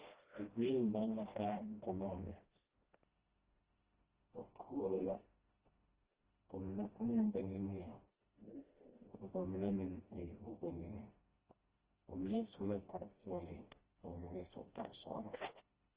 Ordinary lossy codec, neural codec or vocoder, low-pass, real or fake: Opus, 16 kbps; codec, 16 kHz, 1 kbps, FreqCodec, smaller model; 3.6 kHz; fake